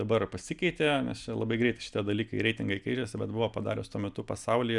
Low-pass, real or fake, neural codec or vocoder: 10.8 kHz; real; none